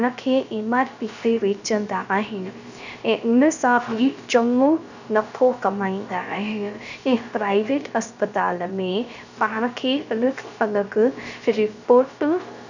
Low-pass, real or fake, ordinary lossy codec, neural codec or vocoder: 7.2 kHz; fake; none; codec, 16 kHz, 0.3 kbps, FocalCodec